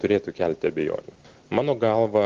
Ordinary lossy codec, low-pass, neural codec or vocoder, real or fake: Opus, 16 kbps; 7.2 kHz; none; real